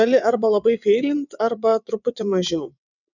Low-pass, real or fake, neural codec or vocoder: 7.2 kHz; fake; vocoder, 22.05 kHz, 80 mel bands, WaveNeXt